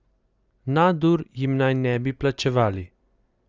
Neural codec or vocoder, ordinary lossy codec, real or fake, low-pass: none; Opus, 24 kbps; real; 7.2 kHz